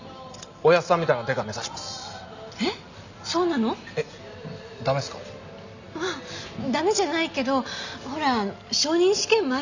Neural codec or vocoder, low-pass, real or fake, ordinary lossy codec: none; 7.2 kHz; real; none